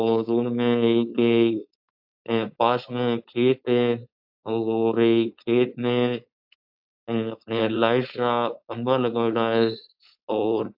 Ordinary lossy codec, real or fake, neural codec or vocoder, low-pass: AAC, 48 kbps; fake; codec, 16 kHz, 4.8 kbps, FACodec; 5.4 kHz